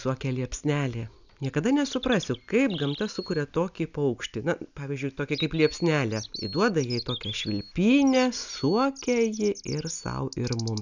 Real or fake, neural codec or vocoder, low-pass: real; none; 7.2 kHz